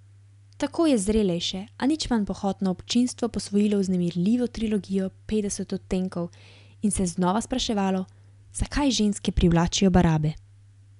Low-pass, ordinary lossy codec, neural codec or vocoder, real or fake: 10.8 kHz; none; none; real